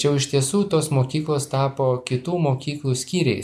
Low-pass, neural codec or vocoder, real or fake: 14.4 kHz; none; real